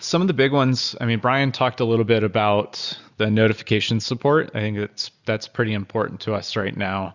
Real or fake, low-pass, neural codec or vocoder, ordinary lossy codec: real; 7.2 kHz; none; Opus, 64 kbps